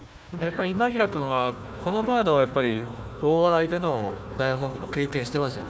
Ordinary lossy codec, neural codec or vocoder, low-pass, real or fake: none; codec, 16 kHz, 1 kbps, FunCodec, trained on Chinese and English, 50 frames a second; none; fake